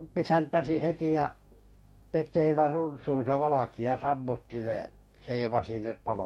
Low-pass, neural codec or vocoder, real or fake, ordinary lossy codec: 19.8 kHz; codec, 44.1 kHz, 2.6 kbps, DAC; fake; MP3, 64 kbps